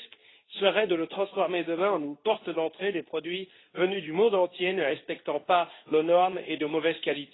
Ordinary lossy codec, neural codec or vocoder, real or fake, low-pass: AAC, 16 kbps; codec, 24 kHz, 0.9 kbps, WavTokenizer, medium speech release version 2; fake; 7.2 kHz